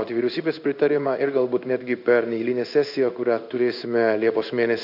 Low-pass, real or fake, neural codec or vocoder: 5.4 kHz; fake; codec, 16 kHz in and 24 kHz out, 1 kbps, XY-Tokenizer